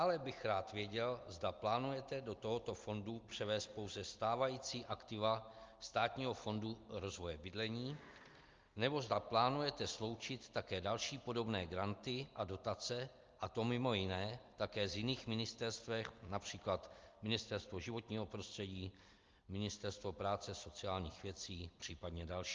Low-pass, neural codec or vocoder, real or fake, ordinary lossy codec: 7.2 kHz; none; real; Opus, 24 kbps